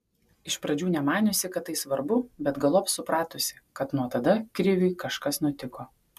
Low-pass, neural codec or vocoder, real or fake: 14.4 kHz; none; real